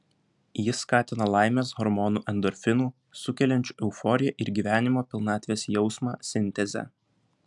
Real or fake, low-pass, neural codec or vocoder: real; 10.8 kHz; none